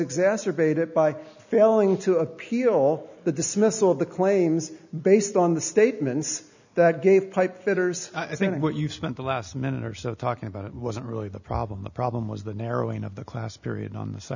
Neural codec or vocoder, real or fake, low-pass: none; real; 7.2 kHz